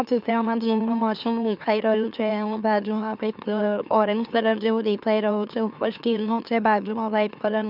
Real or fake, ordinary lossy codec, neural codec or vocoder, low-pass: fake; none; autoencoder, 44.1 kHz, a latent of 192 numbers a frame, MeloTTS; 5.4 kHz